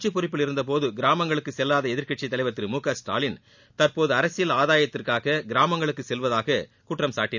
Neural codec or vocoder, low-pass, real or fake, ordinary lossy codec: none; 7.2 kHz; real; none